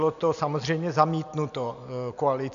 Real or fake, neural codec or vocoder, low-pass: real; none; 7.2 kHz